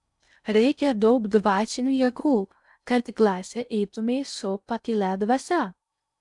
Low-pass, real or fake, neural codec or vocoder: 10.8 kHz; fake; codec, 16 kHz in and 24 kHz out, 0.6 kbps, FocalCodec, streaming, 4096 codes